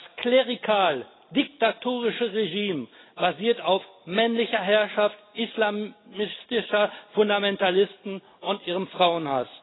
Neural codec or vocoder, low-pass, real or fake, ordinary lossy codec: none; 7.2 kHz; real; AAC, 16 kbps